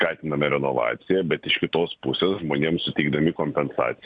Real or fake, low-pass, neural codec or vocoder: real; 9.9 kHz; none